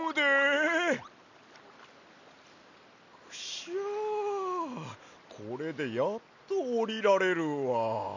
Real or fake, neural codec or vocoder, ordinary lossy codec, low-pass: real; none; none; 7.2 kHz